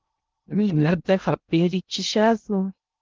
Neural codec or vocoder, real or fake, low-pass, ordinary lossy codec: codec, 16 kHz in and 24 kHz out, 0.6 kbps, FocalCodec, streaming, 2048 codes; fake; 7.2 kHz; Opus, 32 kbps